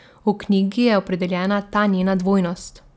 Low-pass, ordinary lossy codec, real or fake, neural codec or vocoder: none; none; real; none